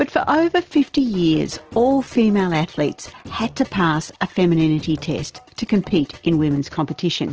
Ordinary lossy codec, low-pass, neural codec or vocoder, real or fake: Opus, 16 kbps; 7.2 kHz; none; real